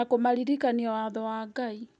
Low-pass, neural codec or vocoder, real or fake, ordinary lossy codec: 9.9 kHz; none; real; none